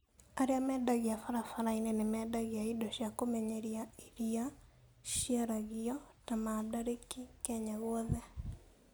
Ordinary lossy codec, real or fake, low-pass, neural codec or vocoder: none; real; none; none